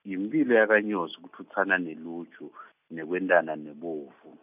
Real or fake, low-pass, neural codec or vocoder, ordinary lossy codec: real; 3.6 kHz; none; none